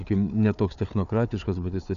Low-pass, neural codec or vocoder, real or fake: 7.2 kHz; codec, 16 kHz, 4 kbps, FunCodec, trained on Chinese and English, 50 frames a second; fake